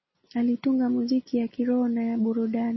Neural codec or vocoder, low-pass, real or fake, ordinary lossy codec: none; 7.2 kHz; real; MP3, 24 kbps